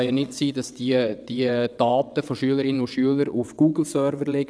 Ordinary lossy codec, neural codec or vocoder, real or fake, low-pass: none; vocoder, 22.05 kHz, 80 mel bands, WaveNeXt; fake; none